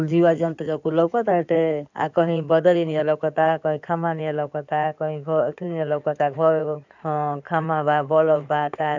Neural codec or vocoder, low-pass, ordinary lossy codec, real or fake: codec, 16 kHz in and 24 kHz out, 2.2 kbps, FireRedTTS-2 codec; 7.2 kHz; none; fake